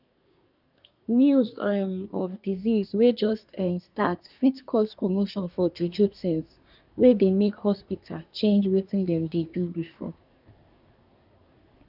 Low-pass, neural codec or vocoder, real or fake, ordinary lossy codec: 5.4 kHz; codec, 24 kHz, 1 kbps, SNAC; fake; none